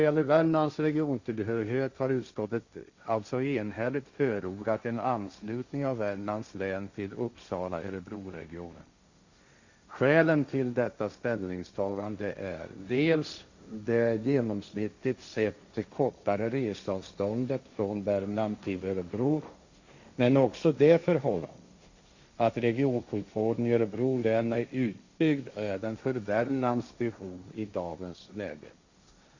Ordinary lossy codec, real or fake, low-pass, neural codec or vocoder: Opus, 64 kbps; fake; 7.2 kHz; codec, 16 kHz, 1.1 kbps, Voila-Tokenizer